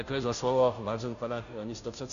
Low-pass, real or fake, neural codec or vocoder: 7.2 kHz; fake; codec, 16 kHz, 0.5 kbps, FunCodec, trained on Chinese and English, 25 frames a second